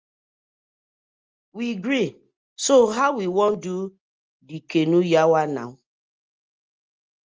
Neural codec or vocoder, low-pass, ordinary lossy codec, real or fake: none; 7.2 kHz; Opus, 24 kbps; real